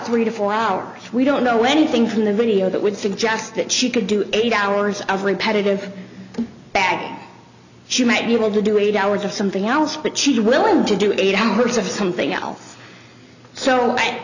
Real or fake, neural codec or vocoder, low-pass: real; none; 7.2 kHz